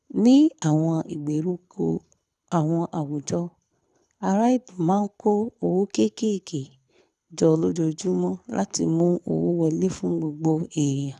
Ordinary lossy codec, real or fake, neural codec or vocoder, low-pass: none; fake; codec, 24 kHz, 6 kbps, HILCodec; none